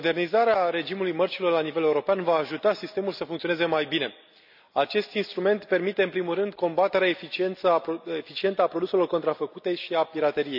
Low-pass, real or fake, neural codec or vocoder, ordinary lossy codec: 5.4 kHz; real; none; none